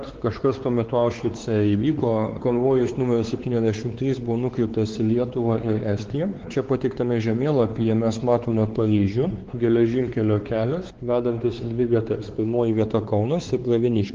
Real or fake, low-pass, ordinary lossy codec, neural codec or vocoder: fake; 7.2 kHz; Opus, 16 kbps; codec, 16 kHz, 4 kbps, X-Codec, WavLM features, trained on Multilingual LibriSpeech